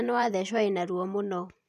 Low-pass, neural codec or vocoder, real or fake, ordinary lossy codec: 14.4 kHz; vocoder, 44.1 kHz, 128 mel bands every 512 samples, BigVGAN v2; fake; none